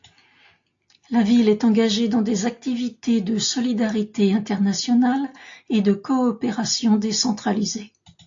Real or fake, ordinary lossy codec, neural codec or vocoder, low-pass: real; AAC, 48 kbps; none; 7.2 kHz